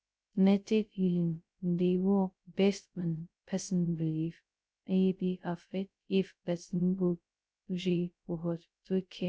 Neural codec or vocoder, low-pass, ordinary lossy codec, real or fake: codec, 16 kHz, 0.2 kbps, FocalCodec; none; none; fake